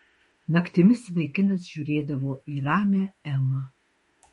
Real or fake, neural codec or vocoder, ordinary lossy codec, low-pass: fake; autoencoder, 48 kHz, 32 numbers a frame, DAC-VAE, trained on Japanese speech; MP3, 48 kbps; 19.8 kHz